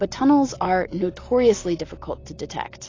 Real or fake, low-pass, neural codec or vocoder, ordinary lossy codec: real; 7.2 kHz; none; AAC, 32 kbps